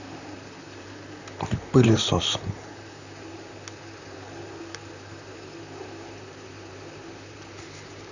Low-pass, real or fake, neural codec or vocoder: 7.2 kHz; fake; vocoder, 44.1 kHz, 128 mel bands, Pupu-Vocoder